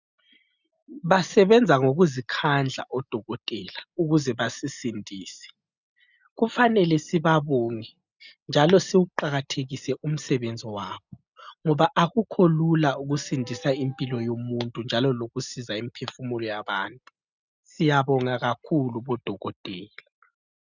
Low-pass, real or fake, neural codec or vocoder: 7.2 kHz; real; none